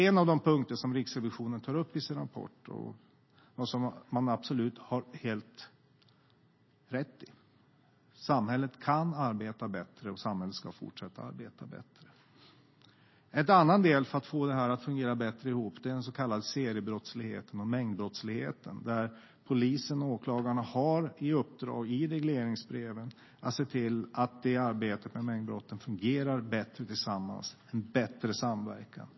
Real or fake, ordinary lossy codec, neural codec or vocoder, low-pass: real; MP3, 24 kbps; none; 7.2 kHz